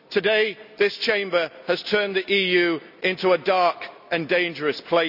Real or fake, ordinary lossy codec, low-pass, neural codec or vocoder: real; none; 5.4 kHz; none